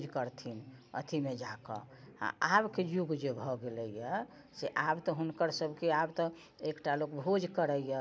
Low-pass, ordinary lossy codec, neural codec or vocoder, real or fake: none; none; none; real